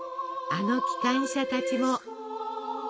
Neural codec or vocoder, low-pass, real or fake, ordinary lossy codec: none; none; real; none